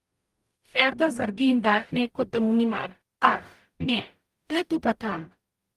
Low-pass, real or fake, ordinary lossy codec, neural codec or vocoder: 14.4 kHz; fake; Opus, 32 kbps; codec, 44.1 kHz, 0.9 kbps, DAC